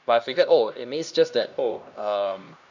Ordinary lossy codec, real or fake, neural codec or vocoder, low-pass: none; fake; codec, 16 kHz, 1 kbps, X-Codec, HuBERT features, trained on LibriSpeech; 7.2 kHz